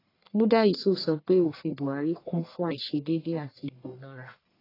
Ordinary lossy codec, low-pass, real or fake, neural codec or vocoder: AAC, 24 kbps; 5.4 kHz; fake; codec, 44.1 kHz, 1.7 kbps, Pupu-Codec